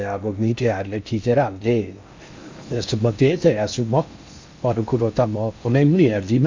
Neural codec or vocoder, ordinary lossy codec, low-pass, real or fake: codec, 16 kHz in and 24 kHz out, 0.6 kbps, FocalCodec, streaming, 4096 codes; MP3, 64 kbps; 7.2 kHz; fake